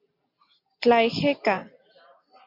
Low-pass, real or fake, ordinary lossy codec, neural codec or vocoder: 5.4 kHz; real; MP3, 48 kbps; none